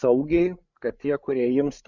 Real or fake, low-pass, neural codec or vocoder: fake; 7.2 kHz; codec, 16 kHz, 8 kbps, FreqCodec, larger model